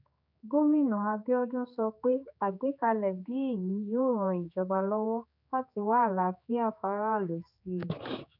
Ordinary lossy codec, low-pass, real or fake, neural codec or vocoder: none; 5.4 kHz; fake; codec, 16 kHz, 4 kbps, X-Codec, HuBERT features, trained on general audio